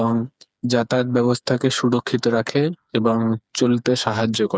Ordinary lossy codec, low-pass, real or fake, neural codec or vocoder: none; none; fake; codec, 16 kHz, 4 kbps, FreqCodec, smaller model